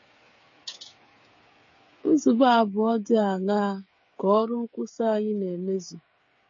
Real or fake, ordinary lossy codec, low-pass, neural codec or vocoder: fake; MP3, 32 kbps; 7.2 kHz; codec, 16 kHz, 8 kbps, FreqCodec, smaller model